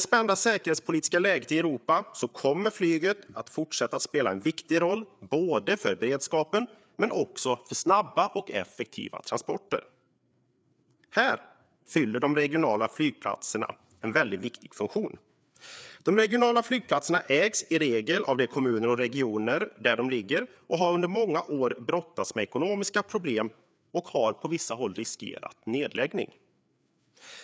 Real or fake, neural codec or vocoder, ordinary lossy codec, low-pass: fake; codec, 16 kHz, 4 kbps, FreqCodec, larger model; none; none